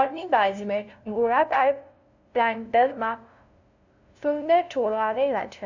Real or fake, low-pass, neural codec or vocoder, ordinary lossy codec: fake; 7.2 kHz; codec, 16 kHz, 0.5 kbps, FunCodec, trained on LibriTTS, 25 frames a second; none